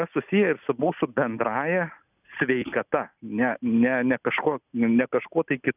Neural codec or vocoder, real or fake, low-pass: none; real; 3.6 kHz